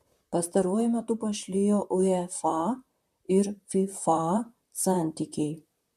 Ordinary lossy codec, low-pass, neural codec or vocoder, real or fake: MP3, 64 kbps; 14.4 kHz; vocoder, 44.1 kHz, 128 mel bands, Pupu-Vocoder; fake